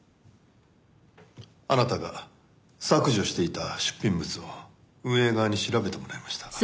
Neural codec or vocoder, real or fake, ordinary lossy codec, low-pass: none; real; none; none